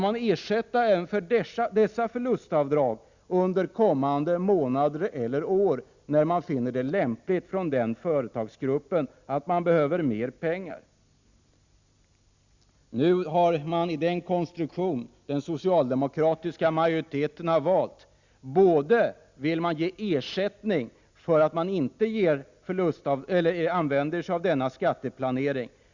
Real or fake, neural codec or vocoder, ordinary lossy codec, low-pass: real; none; Opus, 64 kbps; 7.2 kHz